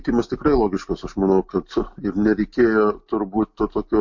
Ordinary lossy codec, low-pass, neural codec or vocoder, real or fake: MP3, 48 kbps; 7.2 kHz; none; real